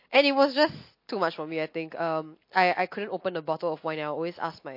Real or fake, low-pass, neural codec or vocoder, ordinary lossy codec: real; 5.4 kHz; none; MP3, 32 kbps